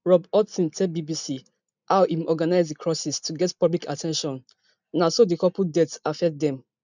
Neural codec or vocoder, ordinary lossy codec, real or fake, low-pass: none; none; real; 7.2 kHz